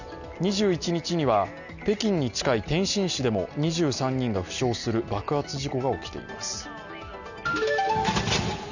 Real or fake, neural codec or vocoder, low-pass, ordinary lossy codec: real; none; 7.2 kHz; none